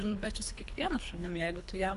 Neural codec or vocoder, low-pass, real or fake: codec, 24 kHz, 3 kbps, HILCodec; 10.8 kHz; fake